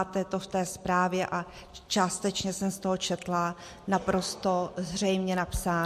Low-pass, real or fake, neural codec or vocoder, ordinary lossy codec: 14.4 kHz; real; none; MP3, 64 kbps